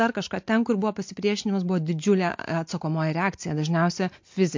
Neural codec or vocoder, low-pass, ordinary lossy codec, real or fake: none; 7.2 kHz; MP3, 48 kbps; real